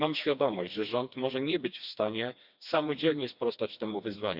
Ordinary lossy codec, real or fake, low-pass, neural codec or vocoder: Opus, 64 kbps; fake; 5.4 kHz; codec, 16 kHz, 2 kbps, FreqCodec, smaller model